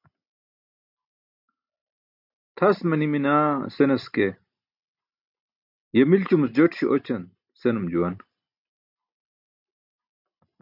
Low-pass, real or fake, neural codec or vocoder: 5.4 kHz; real; none